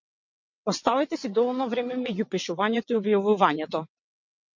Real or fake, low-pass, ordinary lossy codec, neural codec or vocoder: fake; 7.2 kHz; MP3, 48 kbps; vocoder, 22.05 kHz, 80 mel bands, WaveNeXt